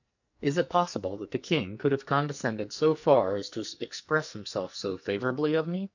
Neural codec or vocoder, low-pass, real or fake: codec, 44.1 kHz, 2.6 kbps, SNAC; 7.2 kHz; fake